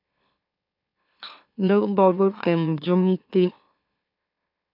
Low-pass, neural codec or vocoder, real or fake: 5.4 kHz; autoencoder, 44.1 kHz, a latent of 192 numbers a frame, MeloTTS; fake